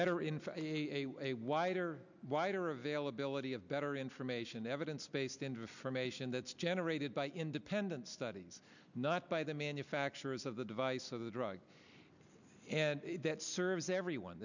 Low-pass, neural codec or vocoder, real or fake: 7.2 kHz; none; real